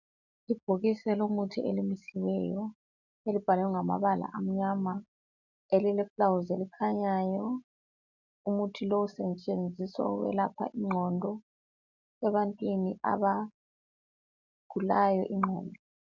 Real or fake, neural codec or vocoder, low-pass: real; none; 7.2 kHz